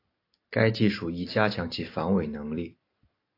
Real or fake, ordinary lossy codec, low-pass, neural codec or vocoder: real; AAC, 32 kbps; 5.4 kHz; none